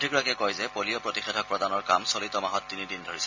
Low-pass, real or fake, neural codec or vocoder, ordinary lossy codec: 7.2 kHz; real; none; MP3, 64 kbps